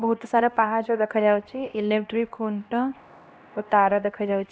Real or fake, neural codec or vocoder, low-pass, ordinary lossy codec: fake; codec, 16 kHz, 1 kbps, X-Codec, HuBERT features, trained on LibriSpeech; none; none